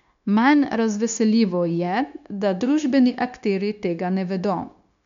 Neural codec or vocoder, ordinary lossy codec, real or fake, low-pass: codec, 16 kHz, 0.9 kbps, LongCat-Audio-Codec; none; fake; 7.2 kHz